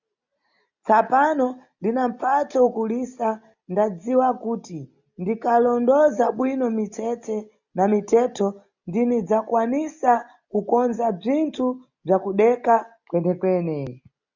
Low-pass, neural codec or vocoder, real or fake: 7.2 kHz; none; real